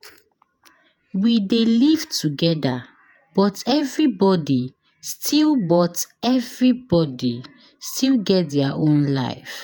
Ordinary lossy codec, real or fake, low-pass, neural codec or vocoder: none; fake; none; vocoder, 48 kHz, 128 mel bands, Vocos